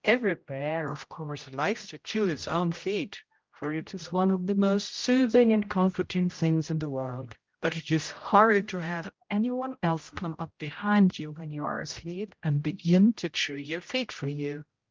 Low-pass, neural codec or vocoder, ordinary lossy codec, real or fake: 7.2 kHz; codec, 16 kHz, 0.5 kbps, X-Codec, HuBERT features, trained on general audio; Opus, 32 kbps; fake